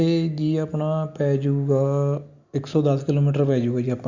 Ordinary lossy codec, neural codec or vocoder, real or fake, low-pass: Opus, 64 kbps; none; real; 7.2 kHz